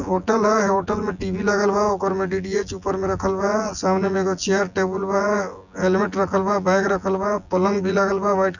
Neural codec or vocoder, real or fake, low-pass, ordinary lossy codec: vocoder, 24 kHz, 100 mel bands, Vocos; fake; 7.2 kHz; none